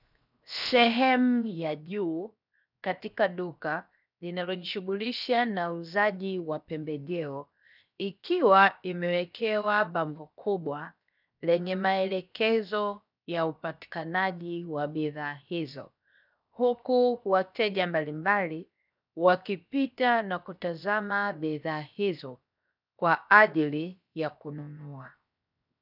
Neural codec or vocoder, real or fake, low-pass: codec, 16 kHz, 0.7 kbps, FocalCodec; fake; 5.4 kHz